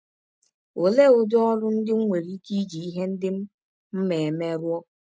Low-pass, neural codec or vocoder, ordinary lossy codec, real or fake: none; none; none; real